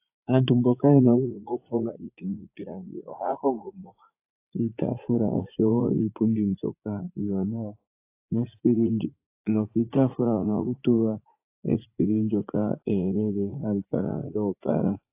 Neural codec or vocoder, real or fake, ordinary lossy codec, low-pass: vocoder, 44.1 kHz, 80 mel bands, Vocos; fake; AAC, 24 kbps; 3.6 kHz